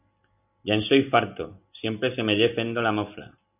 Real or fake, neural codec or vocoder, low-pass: real; none; 3.6 kHz